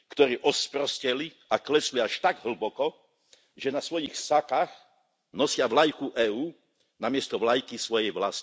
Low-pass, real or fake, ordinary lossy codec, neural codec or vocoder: none; real; none; none